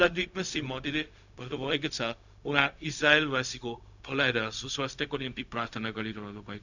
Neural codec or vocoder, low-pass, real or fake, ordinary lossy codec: codec, 16 kHz, 0.4 kbps, LongCat-Audio-Codec; 7.2 kHz; fake; none